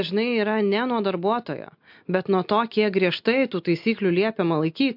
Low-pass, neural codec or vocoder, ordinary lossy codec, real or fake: 5.4 kHz; none; MP3, 48 kbps; real